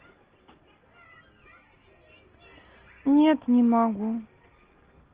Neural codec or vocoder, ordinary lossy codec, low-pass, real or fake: none; Opus, 16 kbps; 3.6 kHz; real